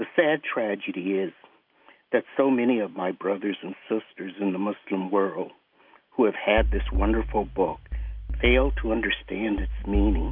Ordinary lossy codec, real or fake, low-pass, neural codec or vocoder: AAC, 48 kbps; real; 5.4 kHz; none